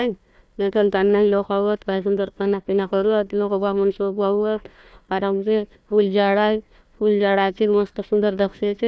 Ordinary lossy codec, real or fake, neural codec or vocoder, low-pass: none; fake; codec, 16 kHz, 1 kbps, FunCodec, trained on Chinese and English, 50 frames a second; none